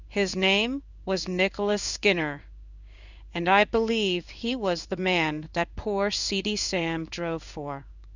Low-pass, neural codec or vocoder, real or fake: 7.2 kHz; codec, 16 kHz in and 24 kHz out, 1 kbps, XY-Tokenizer; fake